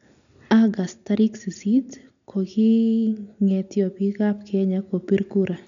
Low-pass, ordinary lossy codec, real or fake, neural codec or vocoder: 7.2 kHz; none; real; none